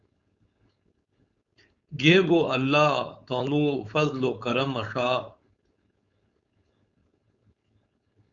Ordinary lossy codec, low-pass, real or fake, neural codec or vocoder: Opus, 64 kbps; 7.2 kHz; fake; codec, 16 kHz, 4.8 kbps, FACodec